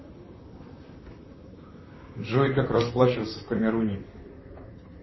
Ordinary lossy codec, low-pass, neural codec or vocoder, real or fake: MP3, 24 kbps; 7.2 kHz; vocoder, 44.1 kHz, 128 mel bands, Pupu-Vocoder; fake